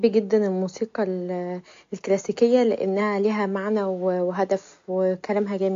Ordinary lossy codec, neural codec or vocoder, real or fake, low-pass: AAC, 48 kbps; none; real; 7.2 kHz